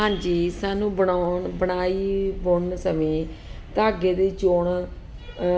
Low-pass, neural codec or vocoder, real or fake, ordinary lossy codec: none; none; real; none